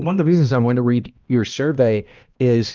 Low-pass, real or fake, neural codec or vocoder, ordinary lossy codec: 7.2 kHz; fake; codec, 16 kHz, 1 kbps, X-Codec, HuBERT features, trained on balanced general audio; Opus, 24 kbps